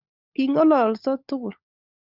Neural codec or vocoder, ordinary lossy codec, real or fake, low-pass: codec, 16 kHz, 16 kbps, FunCodec, trained on LibriTTS, 50 frames a second; Opus, 64 kbps; fake; 5.4 kHz